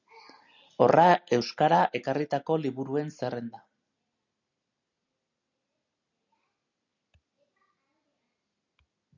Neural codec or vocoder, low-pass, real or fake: none; 7.2 kHz; real